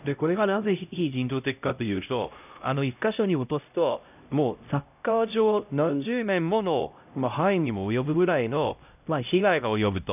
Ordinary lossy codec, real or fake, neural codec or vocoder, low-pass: none; fake; codec, 16 kHz, 0.5 kbps, X-Codec, HuBERT features, trained on LibriSpeech; 3.6 kHz